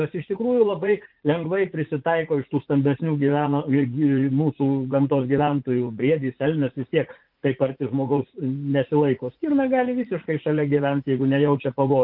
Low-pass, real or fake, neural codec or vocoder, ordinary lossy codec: 5.4 kHz; fake; vocoder, 44.1 kHz, 80 mel bands, Vocos; Opus, 24 kbps